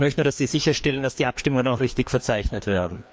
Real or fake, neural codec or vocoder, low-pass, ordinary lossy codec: fake; codec, 16 kHz, 2 kbps, FreqCodec, larger model; none; none